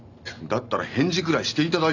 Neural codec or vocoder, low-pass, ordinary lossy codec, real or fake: none; 7.2 kHz; none; real